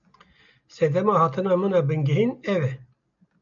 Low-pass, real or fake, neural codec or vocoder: 7.2 kHz; real; none